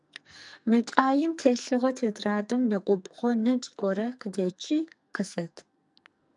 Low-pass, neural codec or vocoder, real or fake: 10.8 kHz; codec, 44.1 kHz, 2.6 kbps, SNAC; fake